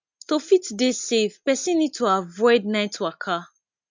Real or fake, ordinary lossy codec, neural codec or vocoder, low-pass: real; MP3, 64 kbps; none; 7.2 kHz